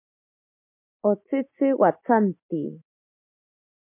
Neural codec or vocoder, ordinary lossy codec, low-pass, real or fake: none; MP3, 32 kbps; 3.6 kHz; real